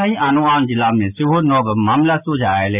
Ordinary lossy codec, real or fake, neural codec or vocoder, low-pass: none; real; none; 3.6 kHz